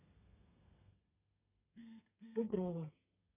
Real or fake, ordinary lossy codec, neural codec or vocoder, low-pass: fake; none; codec, 32 kHz, 1.9 kbps, SNAC; 3.6 kHz